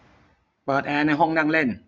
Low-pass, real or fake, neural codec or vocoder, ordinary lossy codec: none; real; none; none